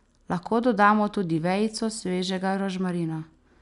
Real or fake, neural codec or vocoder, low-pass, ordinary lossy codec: real; none; 10.8 kHz; none